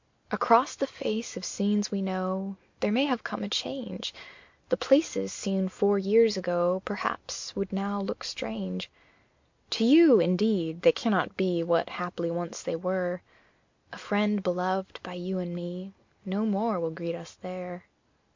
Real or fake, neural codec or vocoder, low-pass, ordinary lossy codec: real; none; 7.2 kHz; MP3, 48 kbps